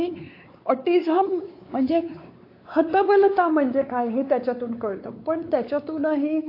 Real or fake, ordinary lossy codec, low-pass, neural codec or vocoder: fake; AAC, 32 kbps; 5.4 kHz; codec, 16 kHz, 4 kbps, X-Codec, WavLM features, trained on Multilingual LibriSpeech